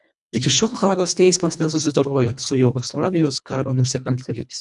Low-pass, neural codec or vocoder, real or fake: 10.8 kHz; codec, 24 kHz, 1.5 kbps, HILCodec; fake